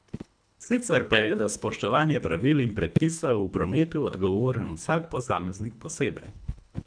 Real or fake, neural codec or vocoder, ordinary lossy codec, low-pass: fake; codec, 24 kHz, 1.5 kbps, HILCodec; none; 9.9 kHz